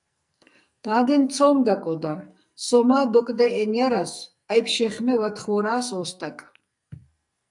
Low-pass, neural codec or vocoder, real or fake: 10.8 kHz; codec, 44.1 kHz, 2.6 kbps, SNAC; fake